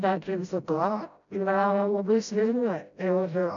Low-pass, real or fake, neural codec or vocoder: 7.2 kHz; fake; codec, 16 kHz, 0.5 kbps, FreqCodec, smaller model